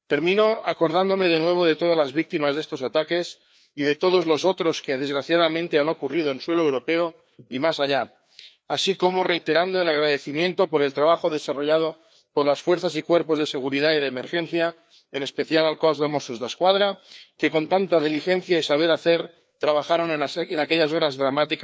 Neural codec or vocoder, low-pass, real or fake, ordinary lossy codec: codec, 16 kHz, 2 kbps, FreqCodec, larger model; none; fake; none